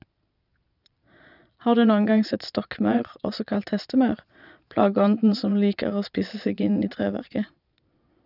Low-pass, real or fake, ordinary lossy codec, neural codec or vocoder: 5.4 kHz; fake; none; vocoder, 22.05 kHz, 80 mel bands, Vocos